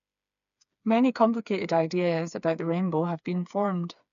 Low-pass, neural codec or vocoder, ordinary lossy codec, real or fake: 7.2 kHz; codec, 16 kHz, 4 kbps, FreqCodec, smaller model; none; fake